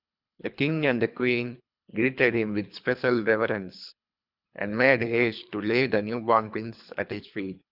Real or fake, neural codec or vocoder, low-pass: fake; codec, 24 kHz, 3 kbps, HILCodec; 5.4 kHz